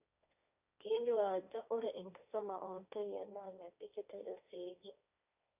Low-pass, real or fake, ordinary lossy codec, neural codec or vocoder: 3.6 kHz; fake; none; codec, 16 kHz, 1.1 kbps, Voila-Tokenizer